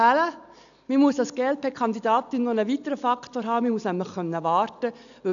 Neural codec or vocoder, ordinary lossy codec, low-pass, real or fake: none; none; 7.2 kHz; real